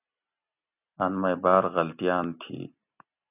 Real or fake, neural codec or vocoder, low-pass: real; none; 3.6 kHz